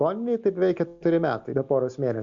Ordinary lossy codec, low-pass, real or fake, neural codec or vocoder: Opus, 64 kbps; 7.2 kHz; real; none